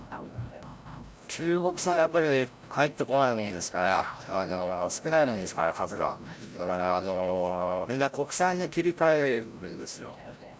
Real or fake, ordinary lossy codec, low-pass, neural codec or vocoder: fake; none; none; codec, 16 kHz, 0.5 kbps, FreqCodec, larger model